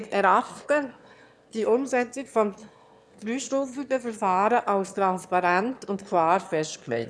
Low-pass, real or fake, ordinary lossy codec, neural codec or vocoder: none; fake; none; autoencoder, 22.05 kHz, a latent of 192 numbers a frame, VITS, trained on one speaker